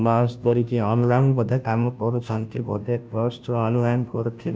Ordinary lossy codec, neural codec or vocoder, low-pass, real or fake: none; codec, 16 kHz, 0.5 kbps, FunCodec, trained on Chinese and English, 25 frames a second; none; fake